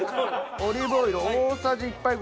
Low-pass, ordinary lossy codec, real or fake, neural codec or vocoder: none; none; real; none